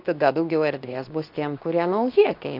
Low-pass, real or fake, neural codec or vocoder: 5.4 kHz; fake; codec, 24 kHz, 0.9 kbps, WavTokenizer, medium speech release version 2